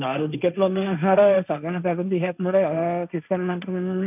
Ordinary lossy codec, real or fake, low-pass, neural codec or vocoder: none; fake; 3.6 kHz; codec, 16 kHz, 1.1 kbps, Voila-Tokenizer